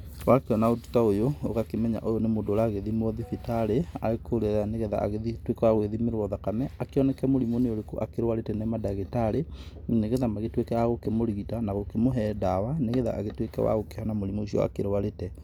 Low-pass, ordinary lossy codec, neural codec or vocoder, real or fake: 19.8 kHz; none; none; real